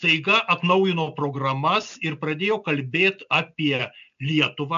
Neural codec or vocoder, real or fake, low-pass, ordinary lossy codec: none; real; 7.2 kHz; AAC, 96 kbps